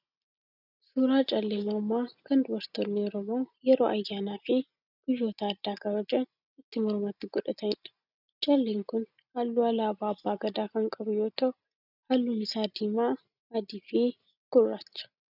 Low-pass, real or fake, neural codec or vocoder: 5.4 kHz; real; none